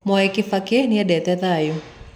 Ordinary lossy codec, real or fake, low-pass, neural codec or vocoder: none; real; 19.8 kHz; none